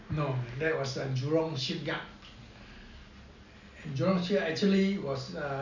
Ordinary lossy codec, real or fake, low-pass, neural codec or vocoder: none; real; 7.2 kHz; none